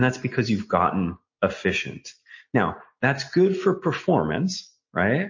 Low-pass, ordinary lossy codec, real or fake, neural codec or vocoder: 7.2 kHz; MP3, 32 kbps; real; none